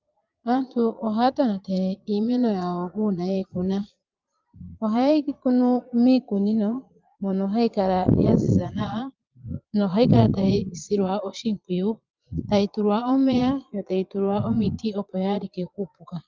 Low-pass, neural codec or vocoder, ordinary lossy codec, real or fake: 7.2 kHz; vocoder, 22.05 kHz, 80 mel bands, Vocos; Opus, 24 kbps; fake